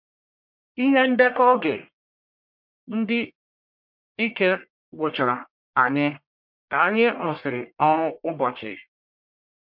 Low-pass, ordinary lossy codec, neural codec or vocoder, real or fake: 5.4 kHz; MP3, 48 kbps; codec, 44.1 kHz, 1.7 kbps, Pupu-Codec; fake